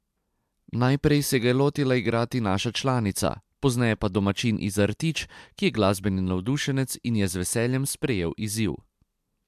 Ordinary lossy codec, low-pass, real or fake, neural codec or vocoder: MP3, 96 kbps; 14.4 kHz; real; none